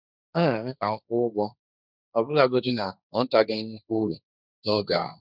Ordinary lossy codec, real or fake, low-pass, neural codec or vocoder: none; fake; 5.4 kHz; codec, 16 kHz, 1.1 kbps, Voila-Tokenizer